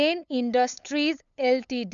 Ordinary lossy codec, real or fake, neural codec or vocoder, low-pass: none; fake; codec, 16 kHz, 8 kbps, FunCodec, trained on LibriTTS, 25 frames a second; 7.2 kHz